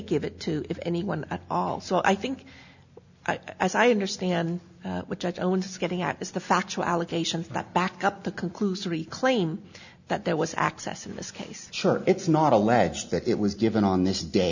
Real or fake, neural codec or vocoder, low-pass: real; none; 7.2 kHz